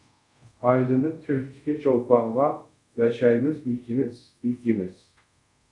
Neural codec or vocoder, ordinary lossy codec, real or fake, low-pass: codec, 24 kHz, 0.5 kbps, DualCodec; MP3, 96 kbps; fake; 10.8 kHz